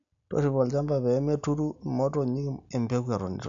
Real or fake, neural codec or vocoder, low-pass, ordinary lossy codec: real; none; 7.2 kHz; AAC, 64 kbps